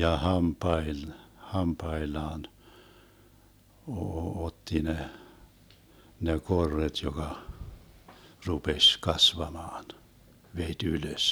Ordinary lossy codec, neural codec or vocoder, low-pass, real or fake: none; none; none; real